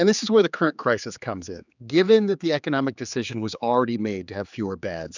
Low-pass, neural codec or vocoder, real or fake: 7.2 kHz; codec, 16 kHz, 4 kbps, X-Codec, HuBERT features, trained on general audio; fake